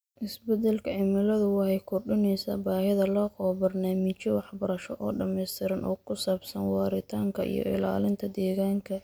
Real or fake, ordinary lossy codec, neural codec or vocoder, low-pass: real; none; none; none